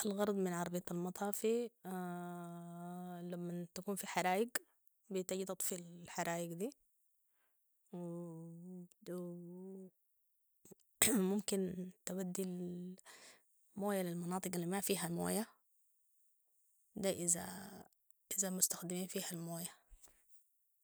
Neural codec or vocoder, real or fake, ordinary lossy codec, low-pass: none; real; none; none